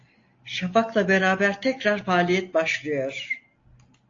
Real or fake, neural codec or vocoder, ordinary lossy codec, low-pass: real; none; AAC, 48 kbps; 7.2 kHz